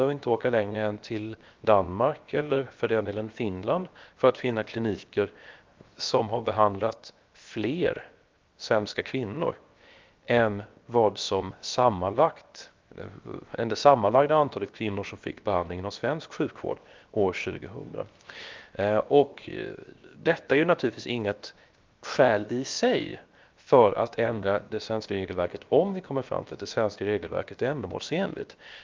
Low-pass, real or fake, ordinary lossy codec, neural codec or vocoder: 7.2 kHz; fake; Opus, 24 kbps; codec, 16 kHz, 0.7 kbps, FocalCodec